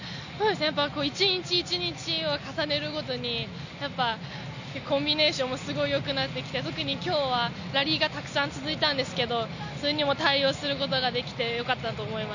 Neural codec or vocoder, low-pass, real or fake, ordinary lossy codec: none; 7.2 kHz; real; none